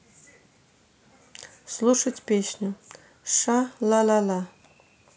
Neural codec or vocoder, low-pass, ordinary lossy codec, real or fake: none; none; none; real